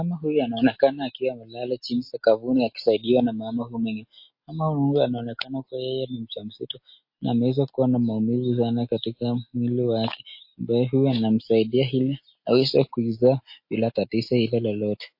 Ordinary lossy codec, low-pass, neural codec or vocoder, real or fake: MP3, 32 kbps; 5.4 kHz; none; real